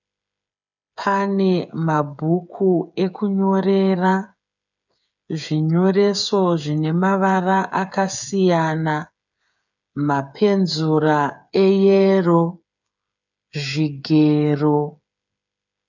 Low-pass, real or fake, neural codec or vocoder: 7.2 kHz; fake; codec, 16 kHz, 8 kbps, FreqCodec, smaller model